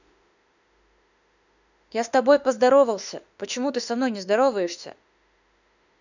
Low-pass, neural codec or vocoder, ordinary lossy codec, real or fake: 7.2 kHz; autoencoder, 48 kHz, 32 numbers a frame, DAC-VAE, trained on Japanese speech; none; fake